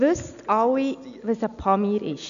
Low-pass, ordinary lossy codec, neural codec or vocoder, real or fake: 7.2 kHz; none; none; real